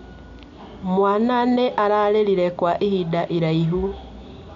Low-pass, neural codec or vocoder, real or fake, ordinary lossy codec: 7.2 kHz; none; real; none